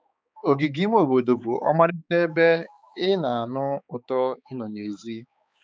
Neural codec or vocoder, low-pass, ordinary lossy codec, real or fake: codec, 16 kHz, 4 kbps, X-Codec, HuBERT features, trained on balanced general audio; none; none; fake